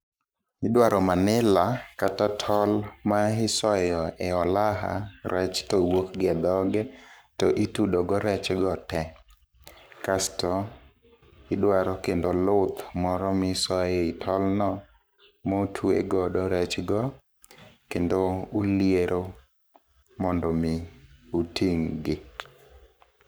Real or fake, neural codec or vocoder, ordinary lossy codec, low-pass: fake; codec, 44.1 kHz, 7.8 kbps, Pupu-Codec; none; none